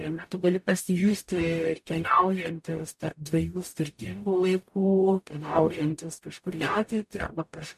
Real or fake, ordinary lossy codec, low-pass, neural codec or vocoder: fake; MP3, 64 kbps; 14.4 kHz; codec, 44.1 kHz, 0.9 kbps, DAC